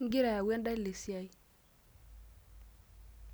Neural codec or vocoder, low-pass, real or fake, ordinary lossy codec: none; none; real; none